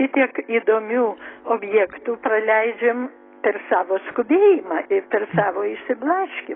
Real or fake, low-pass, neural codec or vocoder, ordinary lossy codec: real; 7.2 kHz; none; AAC, 16 kbps